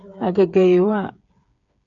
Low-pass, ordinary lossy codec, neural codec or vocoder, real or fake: 7.2 kHz; MP3, 96 kbps; codec, 16 kHz, 8 kbps, FreqCodec, smaller model; fake